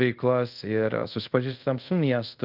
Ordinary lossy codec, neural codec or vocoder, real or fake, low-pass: Opus, 32 kbps; codec, 24 kHz, 0.5 kbps, DualCodec; fake; 5.4 kHz